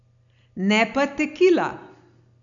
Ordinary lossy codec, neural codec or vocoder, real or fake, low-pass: none; none; real; 7.2 kHz